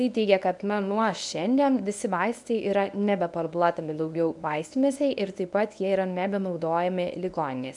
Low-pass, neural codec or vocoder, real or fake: 10.8 kHz; codec, 24 kHz, 0.9 kbps, WavTokenizer, medium speech release version 1; fake